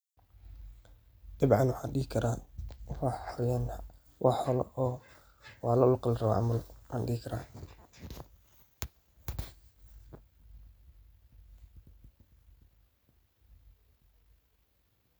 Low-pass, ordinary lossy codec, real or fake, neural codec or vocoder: none; none; real; none